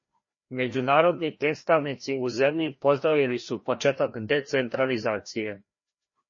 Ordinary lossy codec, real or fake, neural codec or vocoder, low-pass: MP3, 32 kbps; fake; codec, 16 kHz, 1 kbps, FreqCodec, larger model; 7.2 kHz